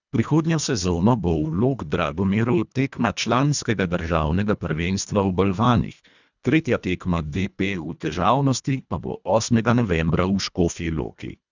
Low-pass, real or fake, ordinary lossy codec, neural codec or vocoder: 7.2 kHz; fake; none; codec, 24 kHz, 1.5 kbps, HILCodec